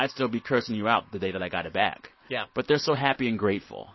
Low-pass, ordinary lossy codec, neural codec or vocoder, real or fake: 7.2 kHz; MP3, 24 kbps; none; real